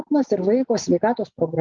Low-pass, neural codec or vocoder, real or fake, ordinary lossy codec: 7.2 kHz; none; real; Opus, 32 kbps